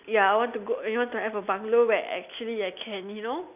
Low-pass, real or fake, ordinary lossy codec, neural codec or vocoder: 3.6 kHz; real; none; none